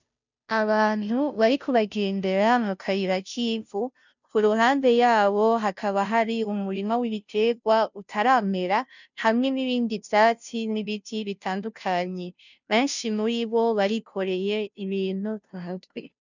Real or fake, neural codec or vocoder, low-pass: fake; codec, 16 kHz, 0.5 kbps, FunCodec, trained on Chinese and English, 25 frames a second; 7.2 kHz